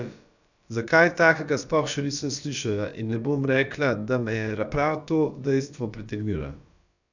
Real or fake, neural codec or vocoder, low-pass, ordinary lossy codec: fake; codec, 16 kHz, about 1 kbps, DyCAST, with the encoder's durations; 7.2 kHz; none